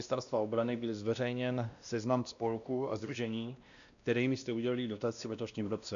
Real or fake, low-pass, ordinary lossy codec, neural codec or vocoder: fake; 7.2 kHz; MP3, 96 kbps; codec, 16 kHz, 1 kbps, X-Codec, WavLM features, trained on Multilingual LibriSpeech